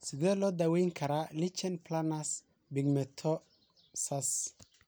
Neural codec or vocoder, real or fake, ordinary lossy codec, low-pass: none; real; none; none